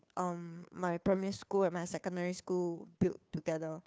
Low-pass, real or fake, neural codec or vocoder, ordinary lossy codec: none; fake; codec, 16 kHz, 2 kbps, FunCodec, trained on Chinese and English, 25 frames a second; none